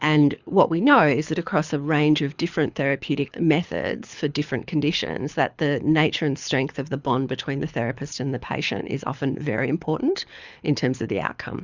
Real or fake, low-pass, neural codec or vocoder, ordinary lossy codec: fake; 7.2 kHz; codec, 24 kHz, 6 kbps, HILCodec; Opus, 64 kbps